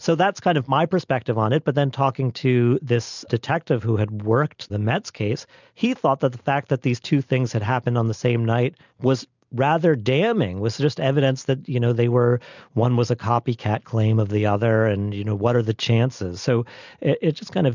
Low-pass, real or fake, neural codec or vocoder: 7.2 kHz; real; none